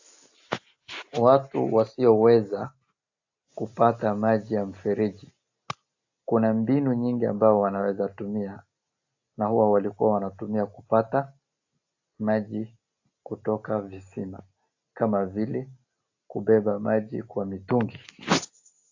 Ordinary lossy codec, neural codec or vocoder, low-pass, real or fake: AAC, 48 kbps; none; 7.2 kHz; real